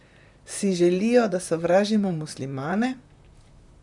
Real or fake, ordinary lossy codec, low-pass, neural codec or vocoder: fake; none; 10.8 kHz; vocoder, 44.1 kHz, 128 mel bands, Pupu-Vocoder